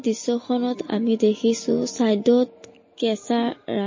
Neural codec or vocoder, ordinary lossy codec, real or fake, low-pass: none; MP3, 32 kbps; real; 7.2 kHz